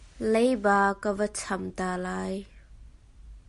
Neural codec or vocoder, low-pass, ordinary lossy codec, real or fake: none; 14.4 kHz; MP3, 48 kbps; real